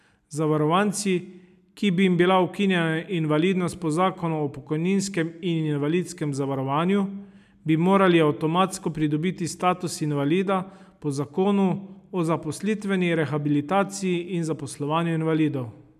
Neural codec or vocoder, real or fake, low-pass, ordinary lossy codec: none; real; 14.4 kHz; none